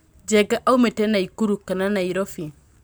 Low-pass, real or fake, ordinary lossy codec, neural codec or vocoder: none; real; none; none